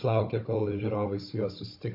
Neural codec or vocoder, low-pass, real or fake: codec, 16 kHz, 16 kbps, FunCodec, trained on Chinese and English, 50 frames a second; 5.4 kHz; fake